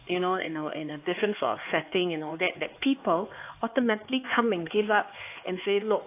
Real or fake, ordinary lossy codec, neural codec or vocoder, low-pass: fake; AAC, 24 kbps; codec, 16 kHz, 4 kbps, X-Codec, HuBERT features, trained on LibriSpeech; 3.6 kHz